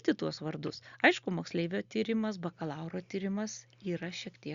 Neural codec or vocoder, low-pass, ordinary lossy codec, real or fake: none; 7.2 kHz; Opus, 64 kbps; real